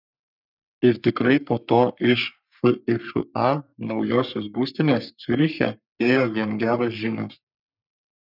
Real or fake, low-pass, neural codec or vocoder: fake; 5.4 kHz; codec, 44.1 kHz, 3.4 kbps, Pupu-Codec